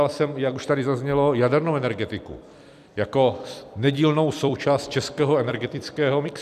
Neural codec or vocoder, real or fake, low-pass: vocoder, 44.1 kHz, 128 mel bands every 256 samples, BigVGAN v2; fake; 14.4 kHz